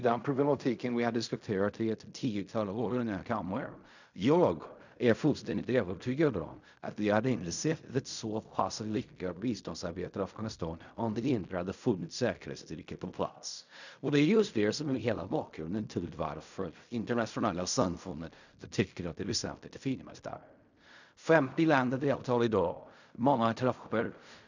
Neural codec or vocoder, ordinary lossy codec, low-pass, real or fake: codec, 16 kHz in and 24 kHz out, 0.4 kbps, LongCat-Audio-Codec, fine tuned four codebook decoder; none; 7.2 kHz; fake